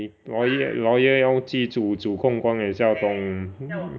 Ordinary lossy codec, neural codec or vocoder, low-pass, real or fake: none; none; none; real